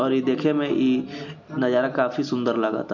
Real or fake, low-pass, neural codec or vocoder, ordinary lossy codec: real; 7.2 kHz; none; none